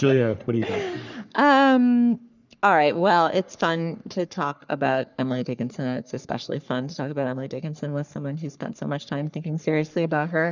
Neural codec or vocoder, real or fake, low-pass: codec, 44.1 kHz, 3.4 kbps, Pupu-Codec; fake; 7.2 kHz